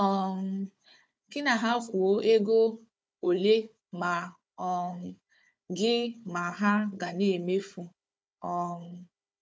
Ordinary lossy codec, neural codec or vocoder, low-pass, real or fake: none; codec, 16 kHz, 4 kbps, FunCodec, trained on Chinese and English, 50 frames a second; none; fake